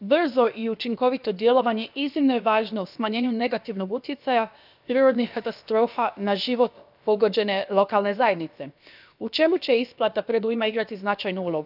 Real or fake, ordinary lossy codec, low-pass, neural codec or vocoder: fake; none; 5.4 kHz; codec, 16 kHz, about 1 kbps, DyCAST, with the encoder's durations